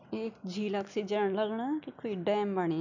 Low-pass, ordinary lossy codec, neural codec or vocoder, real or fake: 7.2 kHz; none; none; real